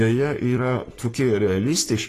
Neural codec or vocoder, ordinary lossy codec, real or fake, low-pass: codec, 44.1 kHz, 3.4 kbps, Pupu-Codec; AAC, 48 kbps; fake; 14.4 kHz